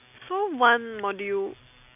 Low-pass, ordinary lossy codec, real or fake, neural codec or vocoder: 3.6 kHz; none; real; none